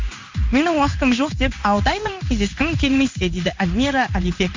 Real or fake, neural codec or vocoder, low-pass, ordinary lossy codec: fake; codec, 16 kHz in and 24 kHz out, 1 kbps, XY-Tokenizer; 7.2 kHz; MP3, 64 kbps